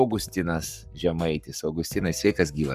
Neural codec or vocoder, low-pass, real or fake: codec, 44.1 kHz, 7.8 kbps, Pupu-Codec; 14.4 kHz; fake